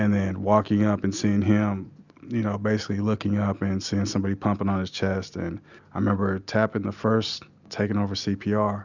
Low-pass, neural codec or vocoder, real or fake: 7.2 kHz; none; real